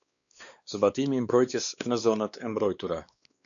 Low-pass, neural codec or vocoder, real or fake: 7.2 kHz; codec, 16 kHz, 2 kbps, X-Codec, WavLM features, trained on Multilingual LibriSpeech; fake